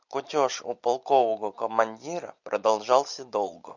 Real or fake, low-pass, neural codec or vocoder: real; 7.2 kHz; none